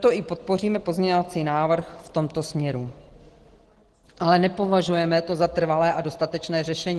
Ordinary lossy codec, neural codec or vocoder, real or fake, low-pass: Opus, 16 kbps; none; real; 10.8 kHz